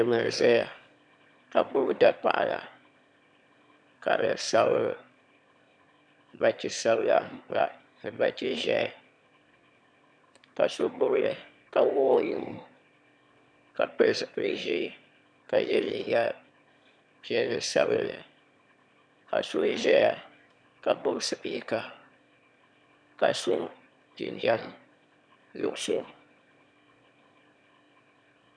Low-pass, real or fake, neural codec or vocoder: 9.9 kHz; fake; autoencoder, 22.05 kHz, a latent of 192 numbers a frame, VITS, trained on one speaker